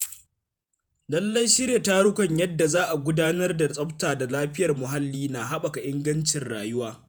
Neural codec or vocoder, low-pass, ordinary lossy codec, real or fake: vocoder, 48 kHz, 128 mel bands, Vocos; none; none; fake